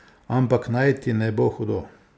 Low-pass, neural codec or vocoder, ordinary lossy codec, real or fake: none; none; none; real